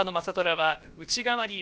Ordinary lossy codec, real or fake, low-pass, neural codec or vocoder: none; fake; none; codec, 16 kHz, about 1 kbps, DyCAST, with the encoder's durations